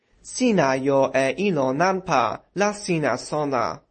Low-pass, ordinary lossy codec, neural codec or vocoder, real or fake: 10.8 kHz; MP3, 32 kbps; none; real